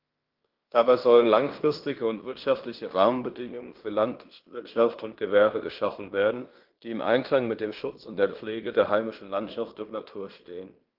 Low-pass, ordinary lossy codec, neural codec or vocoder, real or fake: 5.4 kHz; Opus, 32 kbps; codec, 16 kHz in and 24 kHz out, 0.9 kbps, LongCat-Audio-Codec, fine tuned four codebook decoder; fake